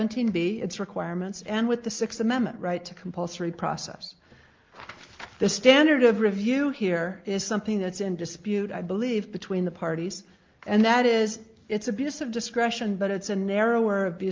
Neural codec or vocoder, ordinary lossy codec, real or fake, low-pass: none; Opus, 32 kbps; real; 7.2 kHz